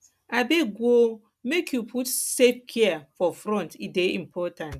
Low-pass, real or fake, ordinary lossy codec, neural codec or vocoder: 14.4 kHz; real; none; none